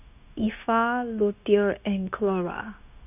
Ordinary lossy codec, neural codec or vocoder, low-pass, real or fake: none; codec, 16 kHz, 0.9 kbps, LongCat-Audio-Codec; 3.6 kHz; fake